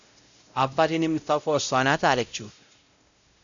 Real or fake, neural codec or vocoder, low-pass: fake; codec, 16 kHz, 0.5 kbps, X-Codec, WavLM features, trained on Multilingual LibriSpeech; 7.2 kHz